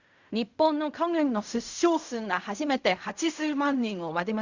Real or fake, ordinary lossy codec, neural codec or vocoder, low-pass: fake; Opus, 64 kbps; codec, 16 kHz in and 24 kHz out, 0.4 kbps, LongCat-Audio-Codec, fine tuned four codebook decoder; 7.2 kHz